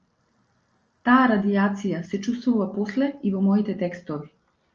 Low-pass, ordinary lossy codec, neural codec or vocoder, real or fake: 7.2 kHz; Opus, 24 kbps; none; real